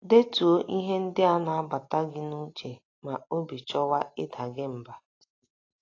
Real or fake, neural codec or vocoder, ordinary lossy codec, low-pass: real; none; AAC, 32 kbps; 7.2 kHz